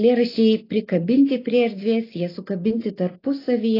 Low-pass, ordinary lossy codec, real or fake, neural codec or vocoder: 5.4 kHz; AAC, 24 kbps; real; none